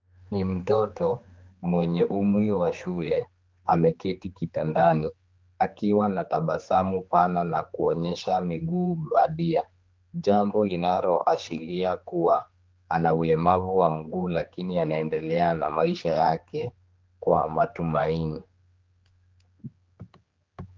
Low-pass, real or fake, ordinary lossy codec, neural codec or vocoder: 7.2 kHz; fake; Opus, 32 kbps; codec, 16 kHz, 2 kbps, X-Codec, HuBERT features, trained on general audio